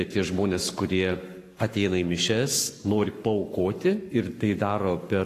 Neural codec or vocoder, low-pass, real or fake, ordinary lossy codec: codec, 44.1 kHz, 7.8 kbps, Pupu-Codec; 14.4 kHz; fake; AAC, 48 kbps